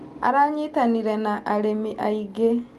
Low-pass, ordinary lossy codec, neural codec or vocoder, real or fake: 14.4 kHz; Opus, 32 kbps; none; real